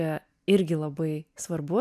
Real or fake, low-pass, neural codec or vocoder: real; 14.4 kHz; none